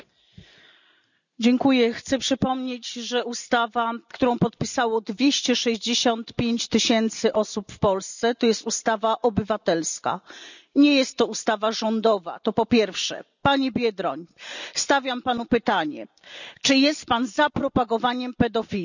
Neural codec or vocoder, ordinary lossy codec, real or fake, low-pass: none; none; real; 7.2 kHz